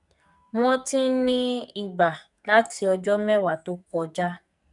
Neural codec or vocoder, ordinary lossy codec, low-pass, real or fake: codec, 44.1 kHz, 2.6 kbps, SNAC; none; 10.8 kHz; fake